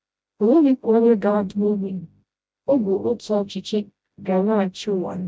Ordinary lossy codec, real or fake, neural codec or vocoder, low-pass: none; fake; codec, 16 kHz, 0.5 kbps, FreqCodec, smaller model; none